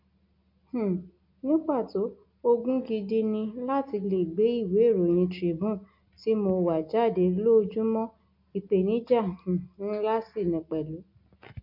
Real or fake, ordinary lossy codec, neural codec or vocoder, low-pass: real; none; none; 5.4 kHz